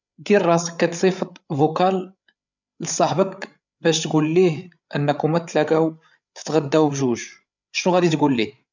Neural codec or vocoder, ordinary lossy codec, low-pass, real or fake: codec, 16 kHz, 8 kbps, FreqCodec, larger model; none; 7.2 kHz; fake